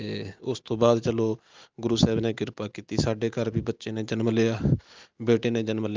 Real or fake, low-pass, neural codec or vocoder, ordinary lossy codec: real; 7.2 kHz; none; Opus, 24 kbps